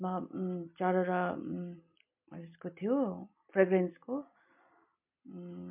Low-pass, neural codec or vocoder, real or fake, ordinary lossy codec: 3.6 kHz; none; real; MP3, 32 kbps